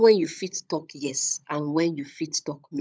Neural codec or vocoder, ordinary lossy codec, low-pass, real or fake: codec, 16 kHz, 16 kbps, FunCodec, trained on LibriTTS, 50 frames a second; none; none; fake